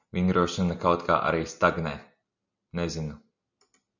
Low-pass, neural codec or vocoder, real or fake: 7.2 kHz; none; real